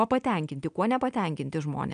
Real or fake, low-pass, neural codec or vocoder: real; 9.9 kHz; none